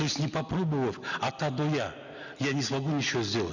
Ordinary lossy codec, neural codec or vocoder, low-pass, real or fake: none; none; 7.2 kHz; real